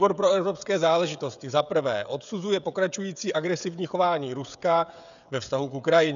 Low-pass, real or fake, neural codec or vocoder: 7.2 kHz; fake; codec, 16 kHz, 16 kbps, FreqCodec, smaller model